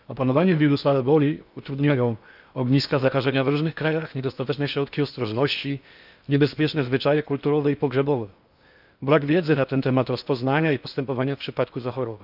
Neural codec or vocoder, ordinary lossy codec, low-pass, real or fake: codec, 16 kHz in and 24 kHz out, 0.8 kbps, FocalCodec, streaming, 65536 codes; none; 5.4 kHz; fake